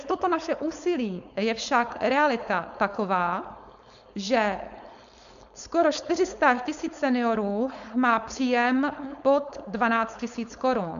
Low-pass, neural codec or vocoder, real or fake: 7.2 kHz; codec, 16 kHz, 4.8 kbps, FACodec; fake